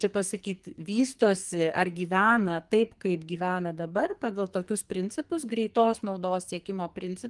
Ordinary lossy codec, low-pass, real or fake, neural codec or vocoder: Opus, 24 kbps; 10.8 kHz; fake; codec, 44.1 kHz, 2.6 kbps, SNAC